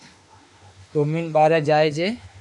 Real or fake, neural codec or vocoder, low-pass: fake; autoencoder, 48 kHz, 32 numbers a frame, DAC-VAE, trained on Japanese speech; 10.8 kHz